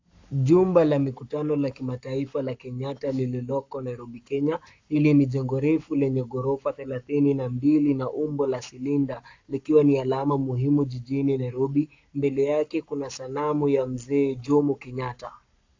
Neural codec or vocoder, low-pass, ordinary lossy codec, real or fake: codec, 44.1 kHz, 7.8 kbps, Pupu-Codec; 7.2 kHz; AAC, 48 kbps; fake